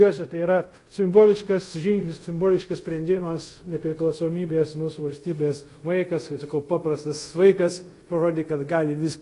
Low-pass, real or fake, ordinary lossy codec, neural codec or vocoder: 10.8 kHz; fake; AAC, 48 kbps; codec, 24 kHz, 0.5 kbps, DualCodec